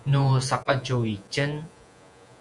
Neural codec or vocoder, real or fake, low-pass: vocoder, 48 kHz, 128 mel bands, Vocos; fake; 10.8 kHz